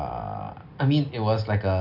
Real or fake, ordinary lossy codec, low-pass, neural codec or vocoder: real; none; 5.4 kHz; none